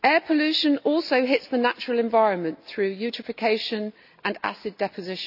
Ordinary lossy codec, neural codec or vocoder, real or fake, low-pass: none; none; real; 5.4 kHz